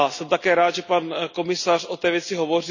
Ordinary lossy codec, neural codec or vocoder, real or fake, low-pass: none; none; real; 7.2 kHz